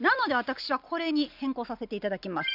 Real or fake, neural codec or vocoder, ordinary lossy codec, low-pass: real; none; none; 5.4 kHz